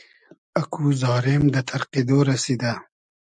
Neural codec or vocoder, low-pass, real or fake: none; 9.9 kHz; real